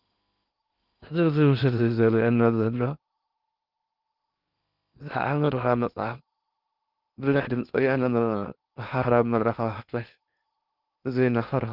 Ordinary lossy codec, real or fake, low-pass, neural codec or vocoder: Opus, 32 kbps; fake; 5.4 kHz; codec, 16 kHz in and 24 kHz out, 0.8 kbps, FocalCodec, streaming, 65536 codes